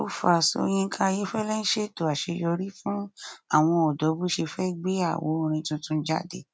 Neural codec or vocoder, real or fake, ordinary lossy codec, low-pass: none; real; none; none